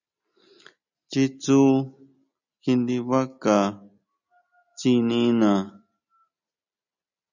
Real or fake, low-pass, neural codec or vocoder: real; 7.2 kHz; none